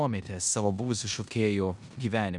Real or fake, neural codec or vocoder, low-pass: fake; codec, 16 kHz in and 24 kHz out, 0.9 kbps, LongCat-Audio-Codec, four codebook decoder; 10.8 kHz